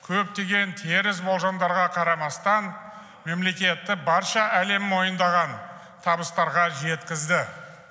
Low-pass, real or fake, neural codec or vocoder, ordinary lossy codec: none; real; none; none